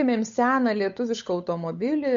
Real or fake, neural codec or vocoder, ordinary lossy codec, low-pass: fake; codec, 16 kHz, 8 kbps, FunCodec, trained on Chinese and English, 25 frames a second; MP3, 48 kbps; 7.2 kHz